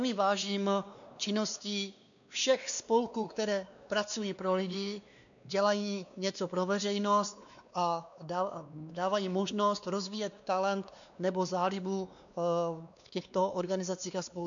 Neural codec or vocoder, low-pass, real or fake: codec, 16 kHz, 2 kbps, X-Codec, WavLM features, trained on Multilingual LibriSpeech; 7.2 kHz; fake